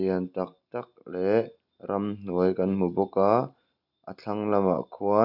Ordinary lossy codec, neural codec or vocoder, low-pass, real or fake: none; none; 5.4 kHz; real